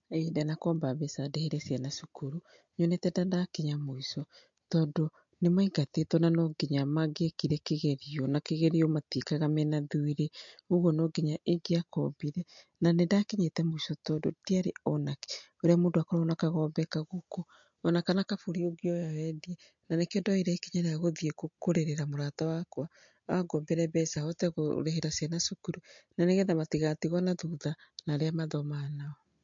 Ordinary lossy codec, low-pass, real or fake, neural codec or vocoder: MP3, 48 kbps; 7.2 kHz; real; none